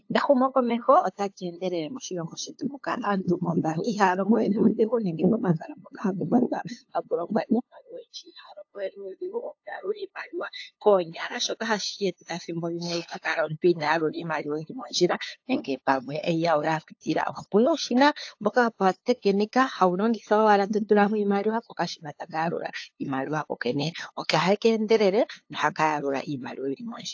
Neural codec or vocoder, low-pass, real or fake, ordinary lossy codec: codec, 16 kHz, 2 kbps, FunCodec, trained on LibriTTS, 25 frames a second; 7.2 kHz; fake; AAC, 48 kbps